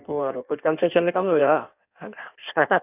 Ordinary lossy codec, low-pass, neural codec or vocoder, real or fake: none; 3.6 kHz; codec, 16 kHz in and 24 kHz out, 1.1 kbps, FireRedTTS-2 codec; fake